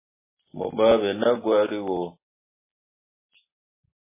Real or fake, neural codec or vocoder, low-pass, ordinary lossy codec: real; none; 3.6 kHz; MP3, 16 kbps